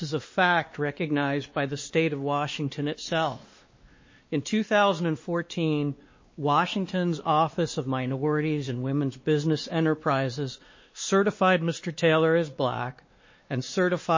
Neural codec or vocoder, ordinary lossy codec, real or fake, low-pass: codec, 16 kHz, 2 kbps, X-Codec, WavLM features, trained on Multilingual LibriSpeech; MP3, 32 kbps; fake; 7.2 kHz